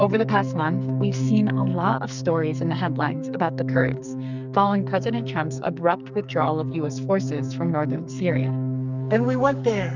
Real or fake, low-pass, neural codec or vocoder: fake; 7.2 kHz; codec, 44.1 kHz, 2.6 kbps, SNAC